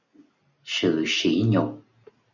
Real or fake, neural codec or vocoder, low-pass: real; none; 7.2 kHz